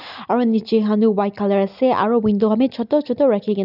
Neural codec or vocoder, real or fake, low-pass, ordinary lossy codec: none; real; 5.4 kHz; none